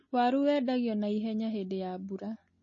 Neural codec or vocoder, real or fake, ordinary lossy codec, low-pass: none; real; MP3, 32 kbps; 7.2 kHz